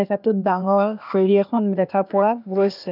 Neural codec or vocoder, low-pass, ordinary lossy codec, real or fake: codec, 16 kHz, 0.8 kbps, ZipCodec; 5.4 kHz; none; fake